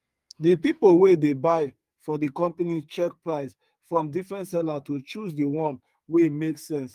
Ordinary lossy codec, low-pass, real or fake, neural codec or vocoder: Opus, 32 kbps; 14.4 kHz; fake; codec, 44.1 kHz, 2.6 kbps, SNAC